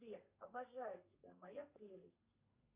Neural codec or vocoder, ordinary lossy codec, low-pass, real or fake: codec, 32 kHz, 1.9 kbps, SNAC; Opus, 64 kbps; 3.6 kHz; fake